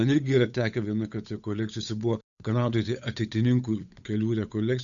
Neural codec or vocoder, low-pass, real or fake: codec, 16 kHz, 8 kbps, FunCodec, trained on Chinese and English, 25 frames a second; 7.2 kHz; fake